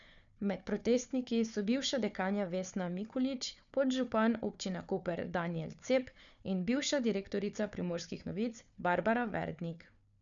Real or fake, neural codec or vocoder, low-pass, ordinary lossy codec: fake; codec, 16 kHz, 4 kbps, FunCodec, trained on Chinese and English, 50 frames a second; 7.2 kHz; none